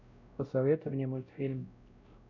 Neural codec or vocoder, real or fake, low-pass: codec, 16 kHz, 0.5 kbps, X-Codec, WavLM features, trained on Multilingual LibriSpeech; fake; 7.2 kHz